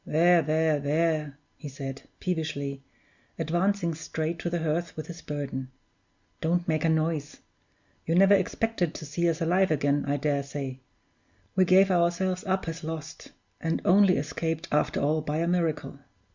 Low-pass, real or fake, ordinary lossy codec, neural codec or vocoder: 7.2 kHz; real; Opus, 64 kbps; none